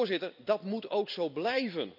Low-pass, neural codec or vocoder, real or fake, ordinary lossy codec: 5.4 kHz; vocoder, 44.1 kHz, 128 mel bands every 256 samples, BigVGAN v2; fake; none